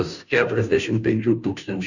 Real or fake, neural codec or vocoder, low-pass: fake; codec, 16 kHz, 0.5 kbps, FunCodec, trained on Chinese and English, 25 frames a second; 7.2 kHz